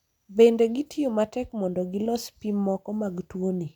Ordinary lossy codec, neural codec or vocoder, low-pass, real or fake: none; none; 19.8 kHz; real